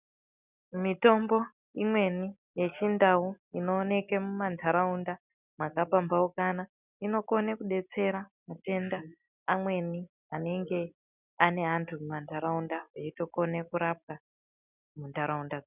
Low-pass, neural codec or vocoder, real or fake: 3.6 kHz; none; real